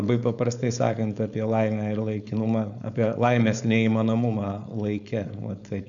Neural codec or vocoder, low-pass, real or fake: codec, 16 kHz, 4.8 kbps, FACodec; 7.2 kHz; fake